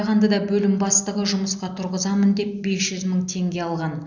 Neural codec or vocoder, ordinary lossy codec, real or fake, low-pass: none; none; real; 7.2 kHz